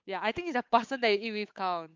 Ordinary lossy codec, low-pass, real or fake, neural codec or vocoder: MP3, 64 kbps; 7.2 kHz; fake; codec, 16 kHz, 8 kbps, FunCodec, trained on Chinese and English, 25 frames a second